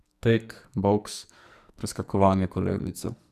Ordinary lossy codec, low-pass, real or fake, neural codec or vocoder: none; 14.4 kHz; fake; codec, 44.1 kHz, 2.6 kbps, SNAC